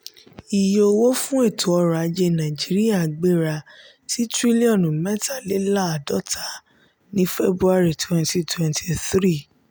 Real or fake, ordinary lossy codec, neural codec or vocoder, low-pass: real; none; none; none